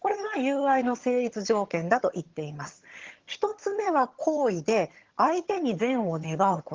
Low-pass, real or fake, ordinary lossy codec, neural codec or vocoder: 7.2 kHz; fake; Opus, 16 kbps; vocoder, 22.05 kHz, 80 mel bands, HiFi-GAN